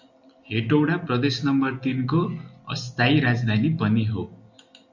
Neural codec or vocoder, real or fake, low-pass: none; real; 7.2 kHz